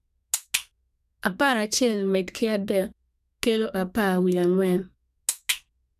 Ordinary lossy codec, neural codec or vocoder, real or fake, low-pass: none; codec, 32 kHz, 1.9 kbps, SNAC; fake; 14.4 kHz